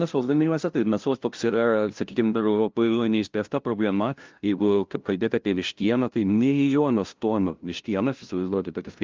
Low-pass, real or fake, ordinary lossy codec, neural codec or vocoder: 7.2 kHz; fake; Opus, 32 kbps; codec, 16 kHz, 0.5 kbps, FunCodec, trained on LibriTTS, 25 frames a second